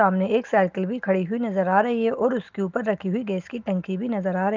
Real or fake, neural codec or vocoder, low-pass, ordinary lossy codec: real; none; 7.2 kHz; Opus, 32 kbps